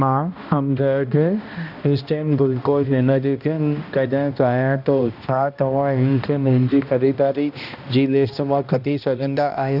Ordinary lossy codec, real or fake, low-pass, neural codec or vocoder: none; fake; 5.4 kHz; codec, 16 kHz, 1 kbps, X-Codec, HuBERT features, trained on balanced general audio